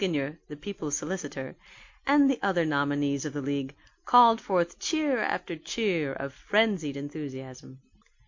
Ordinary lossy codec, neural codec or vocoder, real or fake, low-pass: MP3, 48 kbps; none; real; 7.2 kHz